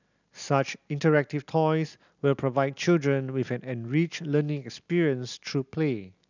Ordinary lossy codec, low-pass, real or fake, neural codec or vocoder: none; 7.2 kHz; real; none